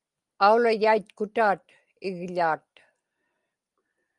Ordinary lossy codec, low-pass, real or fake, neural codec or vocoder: Opus, 32 kbps; 10.8 kHz; real; none